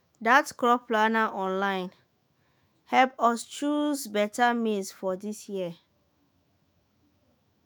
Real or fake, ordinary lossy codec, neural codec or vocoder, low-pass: fake; none; autoencoder, 48 kHz, 128 numbers a frame, DAC-VAE, trained on Japanese speech; none